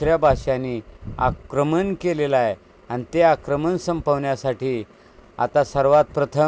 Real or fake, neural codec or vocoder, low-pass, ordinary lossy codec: real; none; none; none